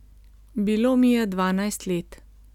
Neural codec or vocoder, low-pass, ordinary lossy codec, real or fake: none; 19.8 kHz; none; real